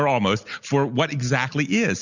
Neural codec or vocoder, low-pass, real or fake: none; 7.2 kHz; real